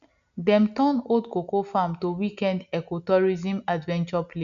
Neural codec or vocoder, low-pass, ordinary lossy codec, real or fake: none; 7.2 kHz; none; real